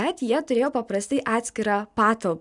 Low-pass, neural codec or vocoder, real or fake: 10.8 kHz; none; real